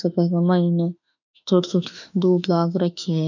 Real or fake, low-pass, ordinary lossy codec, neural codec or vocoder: fake; 7.2 kHz; none; autoencoder, 48 kHz, 32 numbers a frame, DAC-VAE, trained on Japanese speech